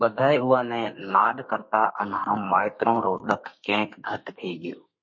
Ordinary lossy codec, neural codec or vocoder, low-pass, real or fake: MP3, 32 kbps; codec, 32 kHz, 1.9 kbps, SNAC; 7.2 kHz; fake